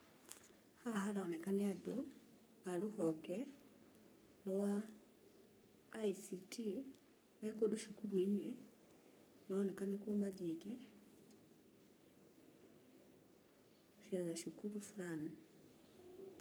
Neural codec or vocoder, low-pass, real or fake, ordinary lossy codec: codec, 44.1 kHz, 3.4 kbps, Pupu-Codec; none; fake; none